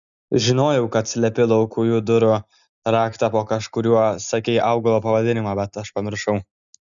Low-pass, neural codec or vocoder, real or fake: 7.2 kHz; none; real